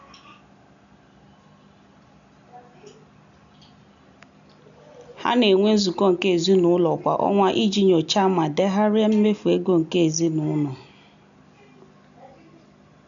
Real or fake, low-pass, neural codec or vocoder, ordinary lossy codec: real; 7.2 kHz; none; none